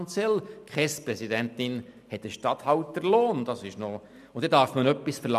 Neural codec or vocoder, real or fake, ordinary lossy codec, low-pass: none; real; none; 14.4 kHz